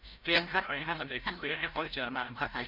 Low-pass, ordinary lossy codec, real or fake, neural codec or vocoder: 5.4 kHz; none; fake; codec, 16 kHz, 0.5 kbps, FreqCodec, larger model